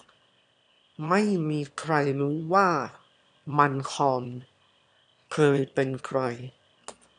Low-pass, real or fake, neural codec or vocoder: 9.9 kHz; fake; autoencoder, 22.05 kHz, a latent of 192 numbers a frame, VITS, trained on one speaker